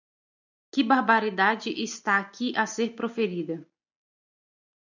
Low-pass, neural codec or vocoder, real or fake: 7.2 kHz; none; real